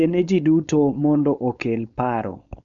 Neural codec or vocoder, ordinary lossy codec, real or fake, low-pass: codec, 16 kHz, 4.8 kbps, FACodec; none; fake; 7.2 kHz